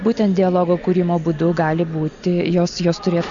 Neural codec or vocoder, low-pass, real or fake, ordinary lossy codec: none; 7.2 kHz; real; Opus, 64 kbps